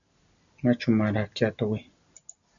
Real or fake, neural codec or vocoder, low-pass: real; none; 7.2 kHz